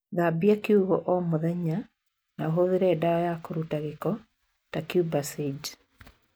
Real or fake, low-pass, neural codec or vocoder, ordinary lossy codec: real; none; none; none